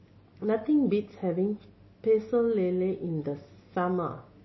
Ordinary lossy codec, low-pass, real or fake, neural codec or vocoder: MP3, 24 kbps; 7.2 kHz; real; none